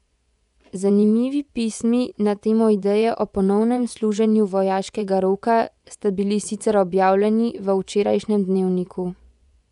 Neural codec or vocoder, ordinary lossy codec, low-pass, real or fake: vocoder, 24 kHz, 100 mel bands, Vocos; none; 10.8 kHz; fake